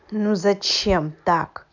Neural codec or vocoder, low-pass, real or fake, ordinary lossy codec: none; 7.2 kHz; real; none